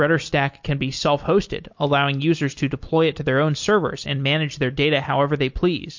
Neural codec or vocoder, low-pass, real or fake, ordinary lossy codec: none; 7.2 kHz; real; MP3, 48 kbps